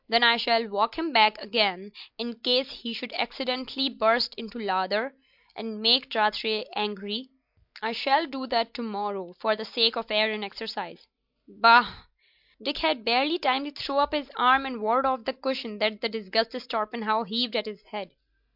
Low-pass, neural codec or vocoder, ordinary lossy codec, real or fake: 5.4 kHz; none; MP3, 48 kbps; real